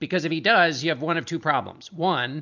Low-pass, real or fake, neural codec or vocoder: 7.2 kHz; real; none